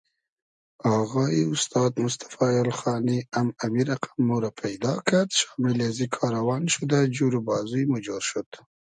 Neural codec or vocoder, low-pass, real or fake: none; 9.9 kHz; real